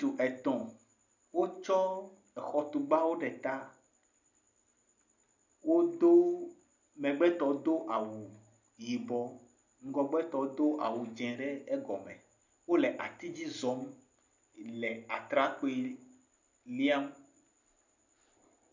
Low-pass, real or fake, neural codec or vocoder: 7.2 kHz; real; none